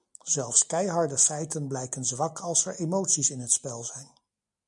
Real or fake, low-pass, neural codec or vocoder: real; 10.8 kHz; none